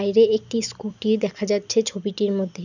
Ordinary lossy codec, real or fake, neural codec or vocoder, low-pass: none; real; none; 7.2 kHz